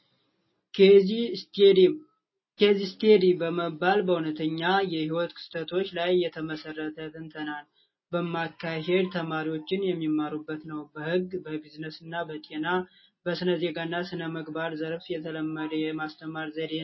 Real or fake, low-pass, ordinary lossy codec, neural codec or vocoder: real; 7.2 kHz; MP3, 24 kbps; none